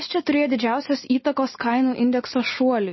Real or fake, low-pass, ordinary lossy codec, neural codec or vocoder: real; 7.2 kHz; MP3, 24 kbps; none